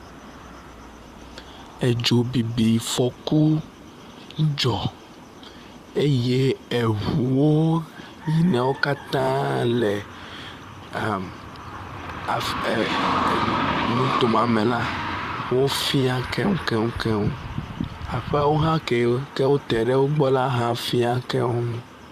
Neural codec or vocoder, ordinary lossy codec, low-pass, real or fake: vocoder, 44.1 kHz, 128 mel bands, Pupu-Vocoder; Opus, 64 kbps; 14.4 kHz; fake